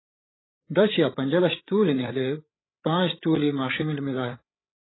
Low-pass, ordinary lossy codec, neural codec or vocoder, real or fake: 7.2 kHz; AAC, 16 kbps; codec, 16 kHz, 8 kbps, FreqCodec, larger model; fake